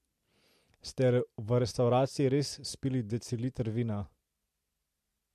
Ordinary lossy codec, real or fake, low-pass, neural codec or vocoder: MP3, 64 kbps; real; 14.4 kHz; none